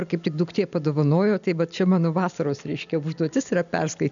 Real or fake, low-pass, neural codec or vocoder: real; 7.2 kHz; none